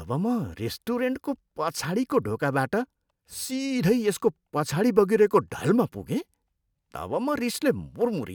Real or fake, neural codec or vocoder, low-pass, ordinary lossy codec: real; none; none; none